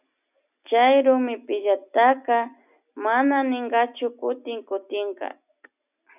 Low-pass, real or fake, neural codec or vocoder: 3.6 kHz; real; none